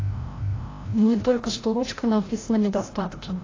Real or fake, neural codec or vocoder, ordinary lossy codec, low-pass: fake; codec, 16 kHz, 0.5 kbps, FreqCodec, larger model; AAC, 32 kbps; 7.2 kHz